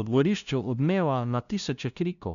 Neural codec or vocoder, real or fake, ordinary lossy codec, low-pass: codec, 16 kHz, 0.5 kbps, FunCodec, trained on LibriTTS, 25 frames a second; fake; none; 7.2 kHz